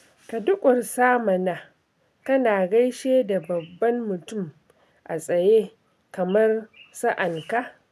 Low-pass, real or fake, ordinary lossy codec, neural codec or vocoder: 14.4 kHz; real; none; none